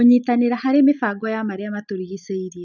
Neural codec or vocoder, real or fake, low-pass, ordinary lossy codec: none; real; 7.2 kHz; none